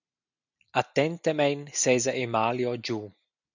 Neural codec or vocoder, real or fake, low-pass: none; real; 7.2 kHz